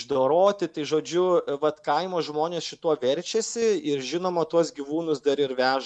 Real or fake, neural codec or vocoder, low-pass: real; none; 10.8 kHz